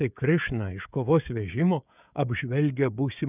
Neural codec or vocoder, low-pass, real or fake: codec, 16 kHz, 16 kbps, FreqCodec, smaller model; 3.6 kHz; fake